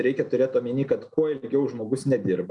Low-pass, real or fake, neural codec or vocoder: 10.8 kHz; real; none